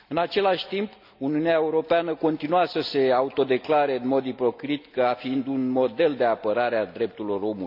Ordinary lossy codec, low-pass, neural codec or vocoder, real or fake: none; 5.4 kHz; none; real